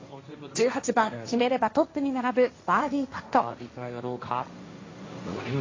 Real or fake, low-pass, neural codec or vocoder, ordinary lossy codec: fake; 7.2 kHz; codec, 16 kHz, 1.1 kbps, Voila-Tokenizer; AAC, 32 kbps